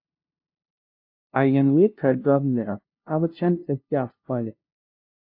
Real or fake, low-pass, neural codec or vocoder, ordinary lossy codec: fake; 5.4 kHz; codec, 16 kHz, 0.5 kbps, FunCodec, trained on LibriTTS, 25 frames a second; AAC, 32 kbps